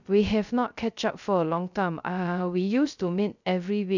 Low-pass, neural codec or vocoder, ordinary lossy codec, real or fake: 7.2 kHz; codec, 16 kHz, 0.3 kbps, FocalCodec; none; fake